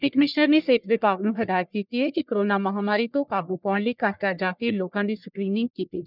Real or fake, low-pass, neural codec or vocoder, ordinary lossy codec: fake; 5.4 kHz; codec, 44.1 kHz, 1.7 kbps, Pupu-Codec; none